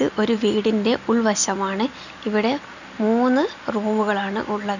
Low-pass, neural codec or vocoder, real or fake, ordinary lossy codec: 7.2 kHz; none; real; none